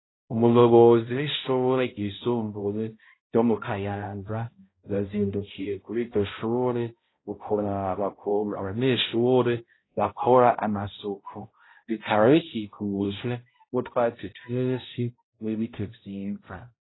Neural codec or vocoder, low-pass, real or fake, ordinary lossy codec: codec, 16 kHz, 0.5 kbps, X-Codec, HuBERT features, trained on balanced general audio; 7.2 kHz; fake; AAC, 16 kbps